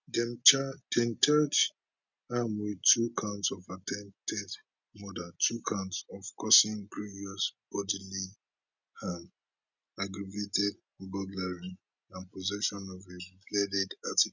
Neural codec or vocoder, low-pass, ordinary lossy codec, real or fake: none; 7.2 kHz; none; real